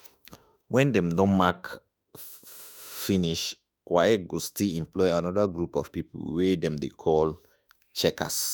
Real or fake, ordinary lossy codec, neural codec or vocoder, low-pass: fake; none; autoencoder, 48 kHz, 32 numbers a frame, DAC-VAE, trained on Japanese speech; none